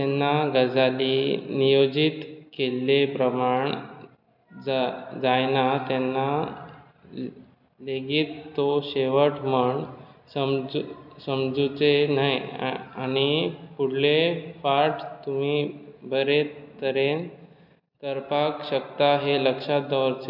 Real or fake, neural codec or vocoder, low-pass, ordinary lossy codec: real; none; 5.4 kHz; none